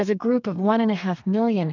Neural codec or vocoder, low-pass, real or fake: codec, 32 kHz, 1.9 kbps, SNAC; 7.2 kHz; fake